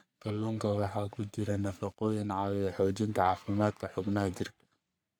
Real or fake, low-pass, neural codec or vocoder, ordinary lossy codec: fake; none; codec, 44.1 kHz, 3.4 kbps, Pupu-Codec; none